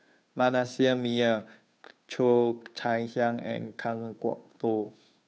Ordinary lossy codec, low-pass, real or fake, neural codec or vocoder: none; none; fake; codec, 16 kHz, 2 kbps, FunCodec, trained on Chinese and English, 25 frames a second